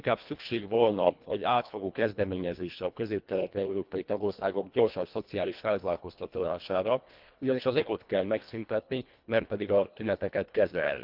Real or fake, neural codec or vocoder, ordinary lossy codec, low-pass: fake; codec, 24 kHz, 1.5 kbps, HILCodec; Opus, 24 kbps; 5.4 kHz